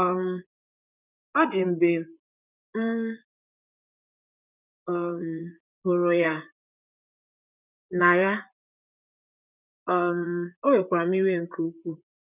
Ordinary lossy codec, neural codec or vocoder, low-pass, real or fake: none; vocoder, 44.1 kHz, 128 mel bands, Pupu-Vocoder; 3.6 kHz; fake